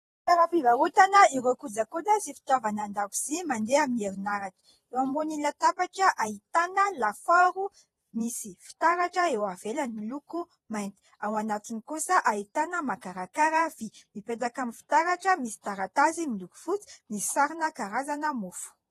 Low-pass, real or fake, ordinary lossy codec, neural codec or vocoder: 19.8 kHz; fake; AAC, 32 kbps; vocoder, 48 kHz, 128 mel bands, Vocos